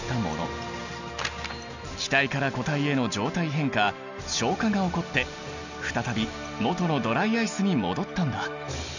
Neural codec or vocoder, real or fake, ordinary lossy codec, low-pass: none; real; none; 7.2 kHz